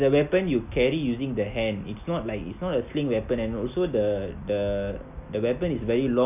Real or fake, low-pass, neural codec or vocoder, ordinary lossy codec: real; 3.6 kHz; none; none